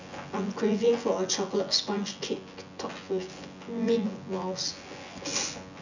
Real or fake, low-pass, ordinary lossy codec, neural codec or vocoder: fake; 7.2 kHz; none; vocoder, 24 kHz, 100 mel bands, Vocos